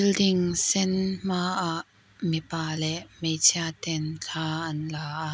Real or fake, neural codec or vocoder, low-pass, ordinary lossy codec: real; none; none; none